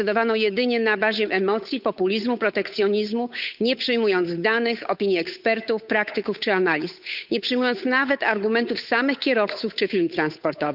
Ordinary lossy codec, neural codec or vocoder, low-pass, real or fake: none; codec, 16 kHz, 8 kbps, FunCodec, trained on Chinese and English, 25 frames a second; 5.4 kHz; fake